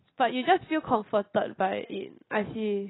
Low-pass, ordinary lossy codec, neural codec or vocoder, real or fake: 7.2 kHz; AAC, 16 kbps; none; real